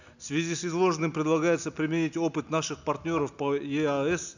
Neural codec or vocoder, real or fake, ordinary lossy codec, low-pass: none; real; none; 7.2 kHz